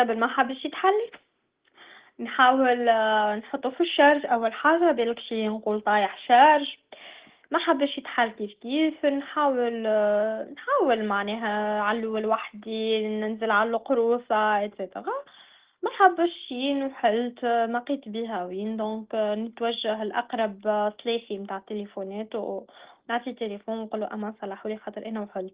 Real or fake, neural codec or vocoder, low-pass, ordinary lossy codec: real; none; 3.6 kHz; Opus, 16 kbps